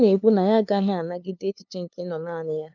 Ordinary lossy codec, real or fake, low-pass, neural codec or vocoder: none; fake; 7.2 kHz; codec, 16 kHz, 2 kbps, FunCodec, trained on LibriTTS, 25 frames a second